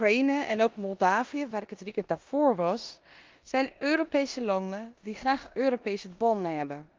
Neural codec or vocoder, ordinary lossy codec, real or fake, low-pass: codec, 16 kHz in and 24 kHz out, 0.9 kbps, LongCat-Audio-Codec, four codebook decoder; Opus, 24 kbps; fake; 7.2 kHz